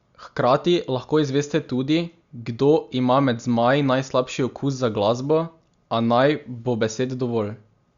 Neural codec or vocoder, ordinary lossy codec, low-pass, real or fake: none; Opus, 64 kbps; 7.2 kHz; real